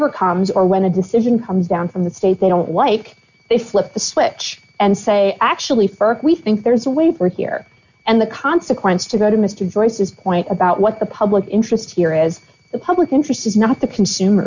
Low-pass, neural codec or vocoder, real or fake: 7.2 kHz; none; real